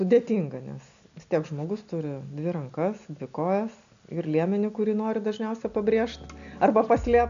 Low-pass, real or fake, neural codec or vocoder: 7.2 kHz; real; none